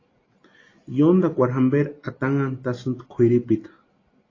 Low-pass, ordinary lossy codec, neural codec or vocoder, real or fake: 7.2 kHz; AAC, 32 kbps; none; real